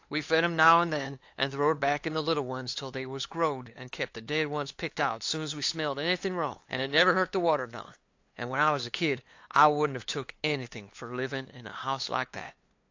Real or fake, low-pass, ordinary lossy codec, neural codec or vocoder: fake; 7.2 kHz; AAC, 48 kbps; codec, 16 kHz, 2 kbps, FunCodec, trained on LibriTTS, 25 frames a second